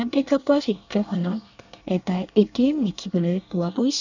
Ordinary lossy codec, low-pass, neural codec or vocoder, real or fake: none; 7.2 kHz; codec, 24 kHz, 1 kbps, SNAC; fake